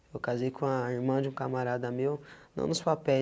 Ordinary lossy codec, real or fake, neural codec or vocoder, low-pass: none; real; none; none